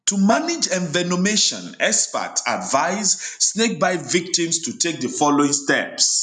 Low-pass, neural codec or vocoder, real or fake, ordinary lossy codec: 9.9 kHz; none; real; none